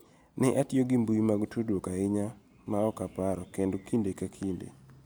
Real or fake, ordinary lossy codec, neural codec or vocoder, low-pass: real; none; none; none